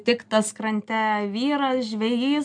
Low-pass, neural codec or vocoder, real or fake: 9.9 kHz; none; real